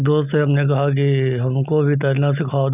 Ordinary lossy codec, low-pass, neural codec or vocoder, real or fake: none; 3.6 kHz; none; real